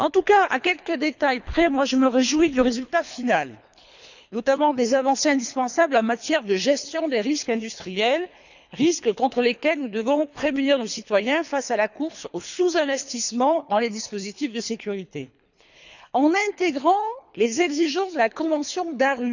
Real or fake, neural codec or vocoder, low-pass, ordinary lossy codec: fake; codec, 24 kHz, 3 kbps, HILCodec; 7.2 kHz; none